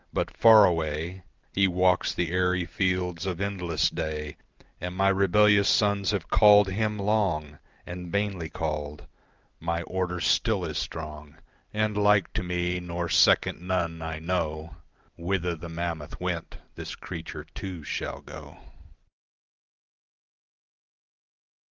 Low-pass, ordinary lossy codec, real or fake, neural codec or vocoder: 7.2 kHz; Opus, 32 kbps; real; none